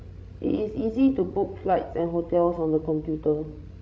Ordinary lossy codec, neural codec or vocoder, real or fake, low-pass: none; codec, 16 kHz, 16 kbps, FreqCodec, larger model; fake; none